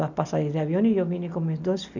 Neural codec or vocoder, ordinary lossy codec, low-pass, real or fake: none; none; 7.2 kHz; real